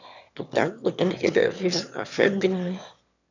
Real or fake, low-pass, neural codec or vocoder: fake; 7.2 kHz; autoencoder, 22.05 kHz, a latent of 192 numbers a frame, VITS, trained on one speaker